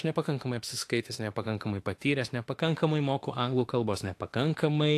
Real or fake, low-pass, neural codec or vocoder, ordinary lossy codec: fake; 14.4 kHz; autoencoder, 48 kHz, 32 numbers a frame, DAC-VAE, trained on Japanese speech; AAC, 64 kbps